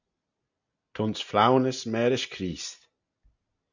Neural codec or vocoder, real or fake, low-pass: none; real; 7.2 kHz